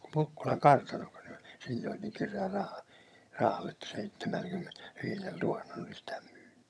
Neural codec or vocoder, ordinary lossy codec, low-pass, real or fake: vocoder, 22.05 kHz, 80 mel bands, HiFi-GAN; none; none; fake